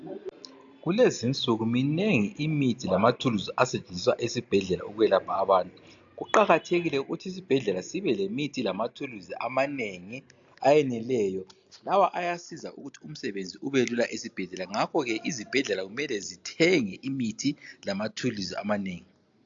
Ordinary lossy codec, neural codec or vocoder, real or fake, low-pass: AAC, 64 kbps; none; real; 7.2 kHz